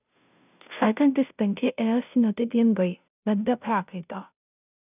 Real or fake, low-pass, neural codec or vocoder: fake; 3.6 kHz; codec, 16 kHz, 0.5 kbps, FunCodec, trained on Chinese and English, 25 frames a second